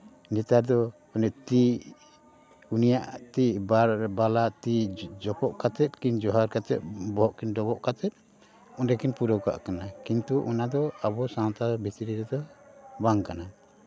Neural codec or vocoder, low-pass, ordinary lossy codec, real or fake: none; none; none; real